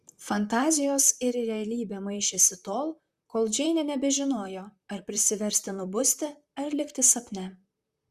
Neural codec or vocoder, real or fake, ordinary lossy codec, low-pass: vocoder, 44.1 kHz, 128 mel bands, Pupu-Vocoder; fake; Opus, 64 kbps; 14.4 kHz